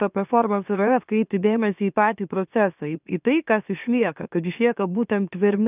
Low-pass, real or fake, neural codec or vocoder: 3.6 kHz; fake; autoencoder, 44.1 kHz, a latent of 192 numbers a frame, MeloTTS